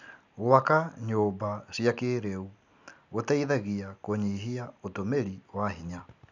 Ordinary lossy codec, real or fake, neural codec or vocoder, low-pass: none; real; none; 7.2 kHz